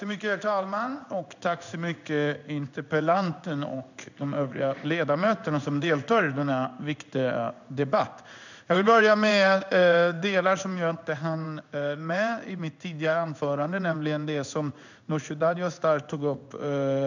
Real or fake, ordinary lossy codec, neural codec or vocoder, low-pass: fake; none; codec, 16 kHz in and 24 kHz out, 1 kbps, XY-Tokenizer; 7.2 kHz